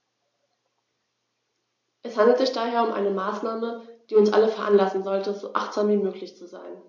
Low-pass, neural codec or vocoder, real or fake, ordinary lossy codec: 7.2 kHz; none; real; MP3, 48 kbps